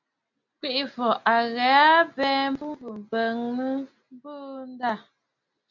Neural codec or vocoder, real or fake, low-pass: none; real; 7.2 kHz